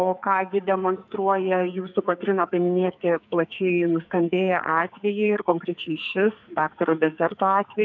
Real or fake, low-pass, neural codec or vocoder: fake; 7.2 kHz; codec, 44.1 kHz, 2.6 kbps, SNAC